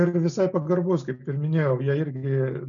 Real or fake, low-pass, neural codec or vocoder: real; 7.2 kHz; none